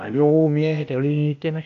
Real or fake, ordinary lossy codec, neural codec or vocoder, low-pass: fake; AAC, 64 kbps; codec, 16 kHz, 0.8 kbps, ZipCodec; 7.2 kHz